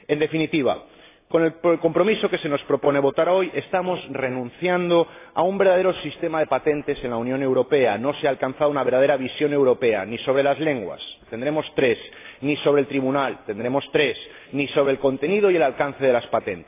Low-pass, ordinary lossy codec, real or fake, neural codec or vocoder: 3.6 kHz; AAC, 24 kbps; real; none